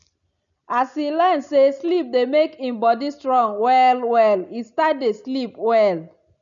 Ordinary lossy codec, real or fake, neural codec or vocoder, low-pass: none; real; none; 7.2 kHz